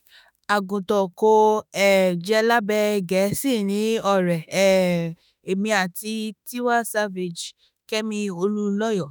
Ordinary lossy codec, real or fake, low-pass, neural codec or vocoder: none; fake; none; autoencoder, 48 kHz, 32 numbers a frame, DAC-VAE, trained on Japanese speech